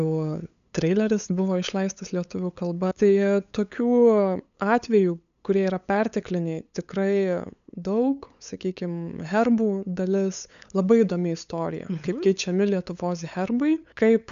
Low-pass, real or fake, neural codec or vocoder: 7.2 kHz; fake; codec, 16 kHz, 8 kbps, FunCodec, trained on LibriTTS, 25 frames a second